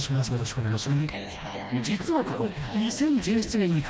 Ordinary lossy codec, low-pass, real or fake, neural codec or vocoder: none; none; fake; codec, 16 kHz, 1 kbps, FreqCodec, smaller model